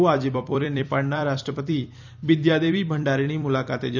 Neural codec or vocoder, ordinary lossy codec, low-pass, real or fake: vocoder, 44.1 kHz, 128 mel bands every 256 samples, BigVGAN v2; none; 7.2 kHz; fake